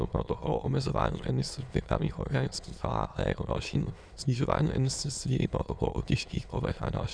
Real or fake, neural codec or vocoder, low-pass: fake; autoencoder, 22.05 kHz, a latent of 192 numbers a frame, VITS, trained on many speakers; 9.9 kHz